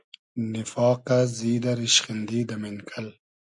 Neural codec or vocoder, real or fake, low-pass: none; real; 10.8 kHz